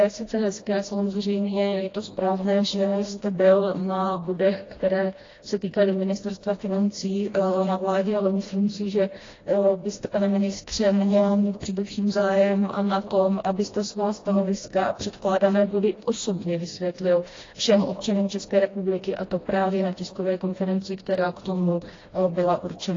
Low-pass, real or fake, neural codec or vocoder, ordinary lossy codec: 7.2 kHz; fake; codec, 16 kHz, 1 kbps, FreqCodec, smaller model; AAC, 32 kbps